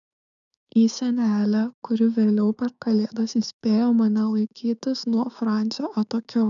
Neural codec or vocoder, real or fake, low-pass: codec, 16 kHz, 6 kbps, DAC; fake; 7.2 kHz